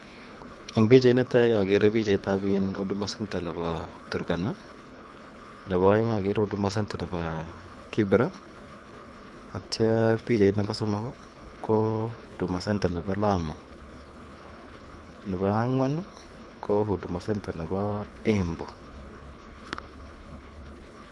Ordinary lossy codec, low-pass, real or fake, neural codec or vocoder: none; none; fake; codec, 24 kHz, 3 kbps, HILCodec